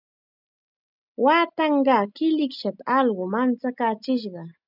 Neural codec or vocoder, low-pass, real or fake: none; 5.4 kHz; real